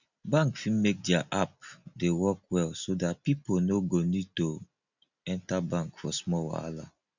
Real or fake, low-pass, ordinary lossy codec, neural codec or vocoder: real; 7.2 kHz; none; none